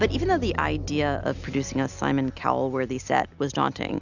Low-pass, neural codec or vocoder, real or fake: 7.2 kHz; none; real